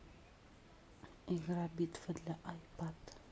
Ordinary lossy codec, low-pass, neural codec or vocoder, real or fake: none; none; none; real